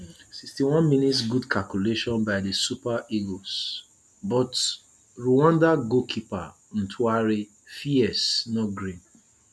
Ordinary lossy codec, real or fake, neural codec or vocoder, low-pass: none; real; none; none